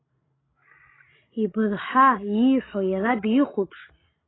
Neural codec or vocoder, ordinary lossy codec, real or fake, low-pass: codec, 16 kHz, 16 kbps, FreqCodec, larger model; AAC, 16 kbps; fake; 7.2 kHz